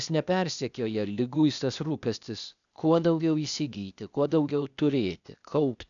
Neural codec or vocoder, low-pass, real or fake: codec, 16 kHz, 0.8 kbps, ZipCodec; 7.2 kHz; fake